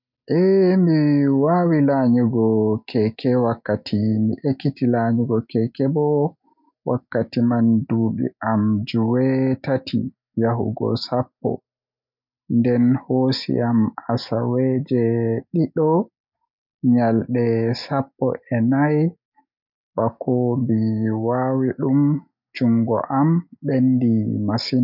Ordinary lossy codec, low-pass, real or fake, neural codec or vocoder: none; 5.4 kHz; real; none